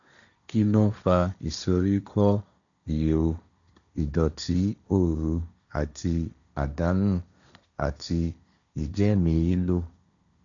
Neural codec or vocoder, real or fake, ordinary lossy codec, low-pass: codec, 16 kHz, 1.1 kbps, Voila-Tokenizer; fake; none; 7.2 kHz